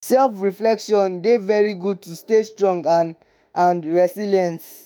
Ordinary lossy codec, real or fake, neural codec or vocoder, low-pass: none; fake; autoencoder, 48 kHz, 32 numbers a frame, DAC-VAE, trained on Japanese speech; none